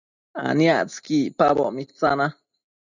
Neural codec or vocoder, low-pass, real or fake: none; 7.2 kHz; real